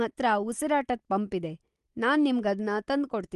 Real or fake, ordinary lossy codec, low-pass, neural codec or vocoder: real; Opus, 24 kbps; 10.8 kHz; none